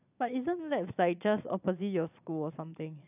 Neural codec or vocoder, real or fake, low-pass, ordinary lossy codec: vocoder, 22.05 kHz, 80 mel bands, Vocos; fake; 3.6 kHz; none